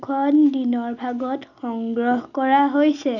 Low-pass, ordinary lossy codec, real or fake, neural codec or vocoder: 7.2 kHz; none; real; none